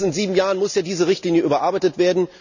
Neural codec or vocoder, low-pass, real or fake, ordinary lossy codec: none; 7.2 kHz; real; none